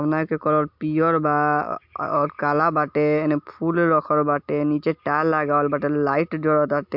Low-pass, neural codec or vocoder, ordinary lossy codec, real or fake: 5.4 kHz; none; MP3, 48 kbps; real